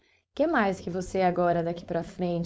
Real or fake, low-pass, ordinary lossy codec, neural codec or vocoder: fake; none; none; codec, 16 kHz, 4.8 kbps, FACodec